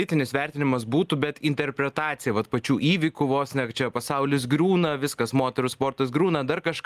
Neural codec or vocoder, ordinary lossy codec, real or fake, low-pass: none; Opus, 32 kbps; real; 14.4 kHz